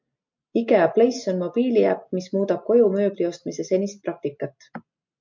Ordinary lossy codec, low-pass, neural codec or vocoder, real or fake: MP3, 64 kbps; 7.2 kHz; none; real